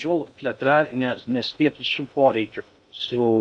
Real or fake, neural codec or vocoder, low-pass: fake; codec, 16 kHz in and 24 kHz out, 0.6 kbps, FocalCodec, streaming, 2048 codes; 9.9 kHz